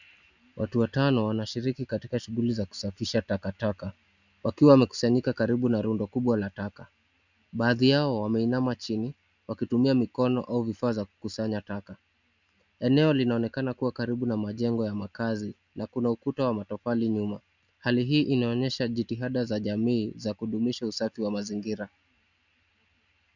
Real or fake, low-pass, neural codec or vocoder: real; 7.2 kHz; none